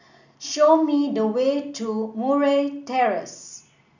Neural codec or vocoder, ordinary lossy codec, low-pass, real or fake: none; none; 7.2 kHz; real